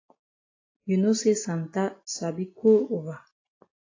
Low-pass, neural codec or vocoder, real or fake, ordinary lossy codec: 7.2 kHz; none; real; AAC, 32 kbps